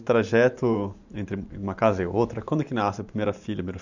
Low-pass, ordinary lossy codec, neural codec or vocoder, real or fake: 7.2 kHz; none; vocoder, 44.1 kHz, 128 mel bands every 512 samples, BigVGAN v2; fake